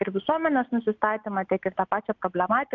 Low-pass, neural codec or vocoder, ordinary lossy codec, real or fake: 7.2 kHz; none; Opus, 24 kbps; real